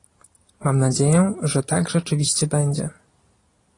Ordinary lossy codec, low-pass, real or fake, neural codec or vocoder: AAC, 32 kbps; 10.8 kHz; real; none